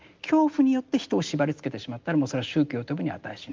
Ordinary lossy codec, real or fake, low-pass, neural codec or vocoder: Opus, 24 kbps; real; 7.2 kHz; none